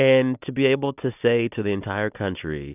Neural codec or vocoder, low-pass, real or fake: none; 3.6 kHz; real